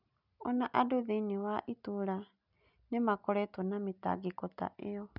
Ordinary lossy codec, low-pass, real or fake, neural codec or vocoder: none; 5.4 kHz; real; none